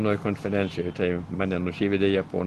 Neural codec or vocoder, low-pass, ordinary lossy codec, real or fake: none; 10.8 kHz; Opus, 16 kbps; real